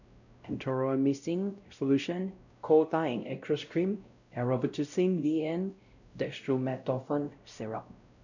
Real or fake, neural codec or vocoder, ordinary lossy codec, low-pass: fake; codec, 16 kHz, 0.5 kbps, X-Codec, WavLM features, trained on Multilingual LibriSpeech; none; 7.2 kHz